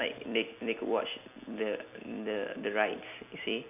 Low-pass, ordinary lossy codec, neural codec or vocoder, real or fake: 3.6 kHz; none; none; real